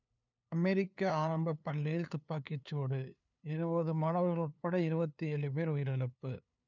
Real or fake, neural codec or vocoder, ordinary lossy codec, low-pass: fake; codec, 16 kHz, 2 kbps, FunCodec, trained on LibriTTS, 25 frames a second; none; 7.2 kHz